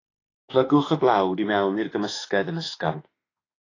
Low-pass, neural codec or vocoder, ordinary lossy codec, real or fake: 7.2 kHz; autoencoder, 48 kHz, 32 numbers a frame, DAC-VAE, trained on Japanese speech; AAC, 32 kbps; fake